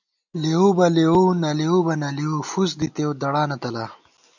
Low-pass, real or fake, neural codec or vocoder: 7.2 kHz; real; none